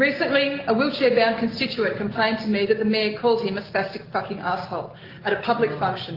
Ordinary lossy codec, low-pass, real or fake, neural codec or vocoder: Opus, 32 kbps; 5.4 kHz; real; none